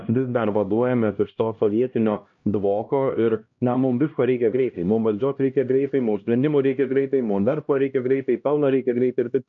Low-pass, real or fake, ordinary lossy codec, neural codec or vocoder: 7.2 kHz; fake; MP3, 48 kbps; codec, 16 kHz, 1 kbps, X-Codec, HuBERT features, trained on LibriSpeech